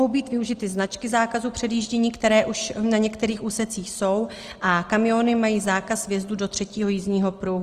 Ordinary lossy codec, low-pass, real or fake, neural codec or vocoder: Opus, 24 kbps; 14.4 kHz; real; none